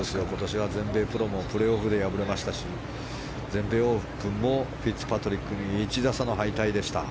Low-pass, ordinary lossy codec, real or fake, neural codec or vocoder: none; none; real; none